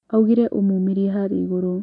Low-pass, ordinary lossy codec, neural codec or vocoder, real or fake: 10.8 kHz; none; none; real